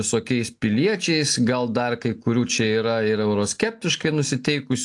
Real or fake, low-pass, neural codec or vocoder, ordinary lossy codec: real; 10.8 kHz; none; AAC, 64 kbps